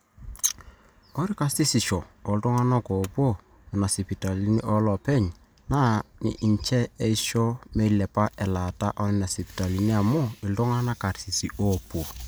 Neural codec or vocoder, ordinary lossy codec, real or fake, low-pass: none; none; real; none